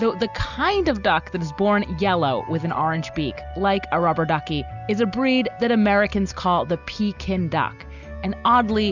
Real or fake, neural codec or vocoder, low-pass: real; none; 7.2 kHz